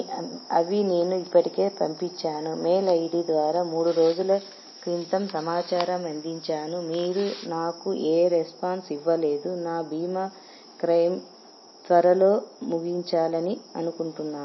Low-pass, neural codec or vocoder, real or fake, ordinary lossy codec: 7.2 kHz; none; real; MP3, 24 kbps